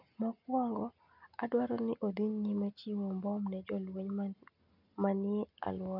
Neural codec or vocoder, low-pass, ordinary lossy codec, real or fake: none; 5.4 kHz; none; real